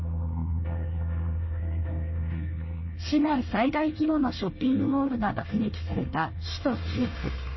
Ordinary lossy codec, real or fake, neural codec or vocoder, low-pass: MP3, 24 kbps; fake; codec, 24 kHz, 1 kbps, SNAC; 7.2 kHz